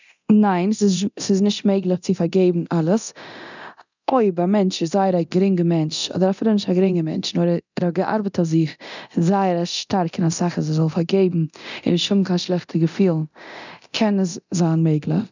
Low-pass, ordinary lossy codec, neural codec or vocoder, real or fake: 7.2 kHz; none; codec, 24 kHz, 0.9 kbps, DualCodec; fake